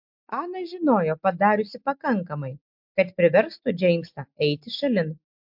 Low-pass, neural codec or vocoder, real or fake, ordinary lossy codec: 5.4 kHz; none; real; MP3, 48 kbps